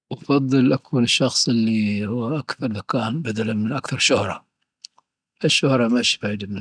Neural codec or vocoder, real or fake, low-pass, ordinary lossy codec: none; real; 9.9 kHz; none